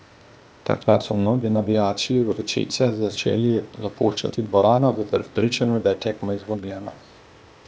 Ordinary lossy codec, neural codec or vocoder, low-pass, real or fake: none; codec, 16 kHz, 0.8 kbps, ZipCodec; none; fake